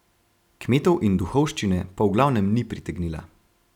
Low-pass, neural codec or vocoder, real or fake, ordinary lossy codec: 19.8 kHz; none; real; none